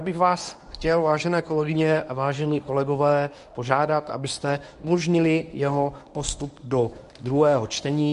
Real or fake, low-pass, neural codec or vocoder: fake; 10.8 kHz; codec, 24 kHz, 0.9 kbps, WavTokenizer, medium speech release version 1